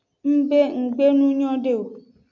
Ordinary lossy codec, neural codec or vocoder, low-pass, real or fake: MP3, 64 kbps; none; 7.2 kHz; real